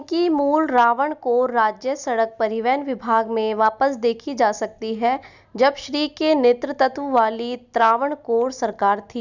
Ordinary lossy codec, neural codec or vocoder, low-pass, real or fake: none; none; 7.2 kHz; real